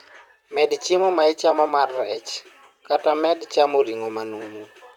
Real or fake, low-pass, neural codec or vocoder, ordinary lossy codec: fake; 19.8 kHz; vocoder, 44.1 kHz, 128 mel bands, Pupu-Vocoder; none